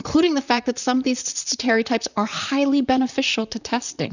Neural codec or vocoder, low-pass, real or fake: vocoder, 44.1 kHz, 128 mel bands, Pupu-Vocoder; 7.2 kHz; fake